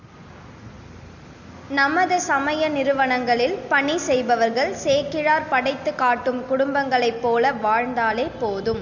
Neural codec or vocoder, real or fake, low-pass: none; real; 7.2 kHz